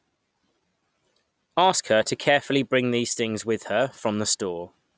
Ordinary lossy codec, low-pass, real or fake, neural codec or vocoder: none; none; real; none